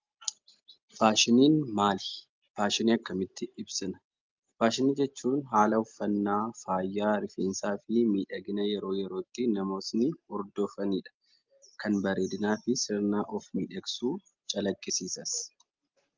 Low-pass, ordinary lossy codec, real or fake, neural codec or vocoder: 7.2 kHz; Opus, 32 kbps; real; none